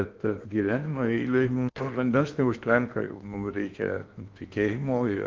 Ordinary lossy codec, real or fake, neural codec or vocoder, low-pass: Opus, 24 kbps; fake; codec, 16 kHz in and 24 kHz out, 0.8 kbps, FocalCodec, streaming, 65536 codes; 7.2 kHz